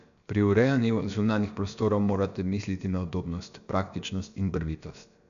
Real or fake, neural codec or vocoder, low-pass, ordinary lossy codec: fake; codec, 16 kHz, about 1 kbps, DyCAST, with the encoder's durations; 7.2 kHz; none